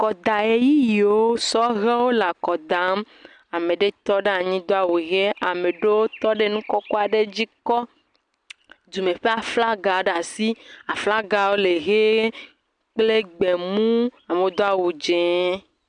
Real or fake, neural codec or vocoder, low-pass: real; none; 9.9 kHz